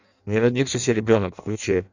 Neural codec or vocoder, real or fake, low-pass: codec, 16 kHz in and 24 kHz out, 0.6 kbps, FireRedTTS-2 codec; fake; 7.2 kHz